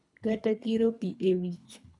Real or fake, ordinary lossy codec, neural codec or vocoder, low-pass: fake; none; codec, 24 kHz, 3 kbps, HILCodec; 10.8 kHz